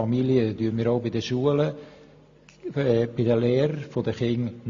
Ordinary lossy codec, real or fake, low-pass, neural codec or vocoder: AAC, 48 kbps; real; 7.2 kHz; none